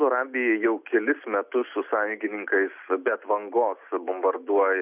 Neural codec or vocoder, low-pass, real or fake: none; 3.6 kHz; real